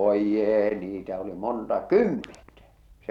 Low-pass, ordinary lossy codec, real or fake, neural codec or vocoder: 19.8 kHz; none; real; none